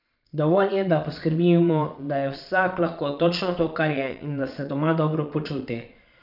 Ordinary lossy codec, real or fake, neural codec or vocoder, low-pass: none; fake; vocoder, 22.05 kHz, 80 mel bands, Vocos; 5.4 kHz